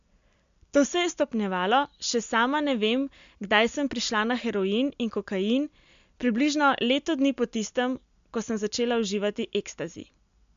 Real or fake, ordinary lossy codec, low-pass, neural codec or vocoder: real; MP3, 64 kbps; 7.2 kHz; none